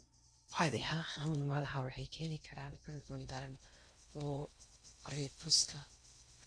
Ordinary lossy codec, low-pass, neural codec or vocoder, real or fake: MP3, 48 kbps; 9.9 kHz; codec, 16 kHz in and 24 kHz out, 0.6 kbps, FocalCodec, streaming, 4096 codes; fake